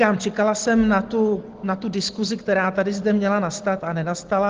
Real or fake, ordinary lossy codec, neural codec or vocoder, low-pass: real; Opus, 16 kbps; none; 7.2 kHz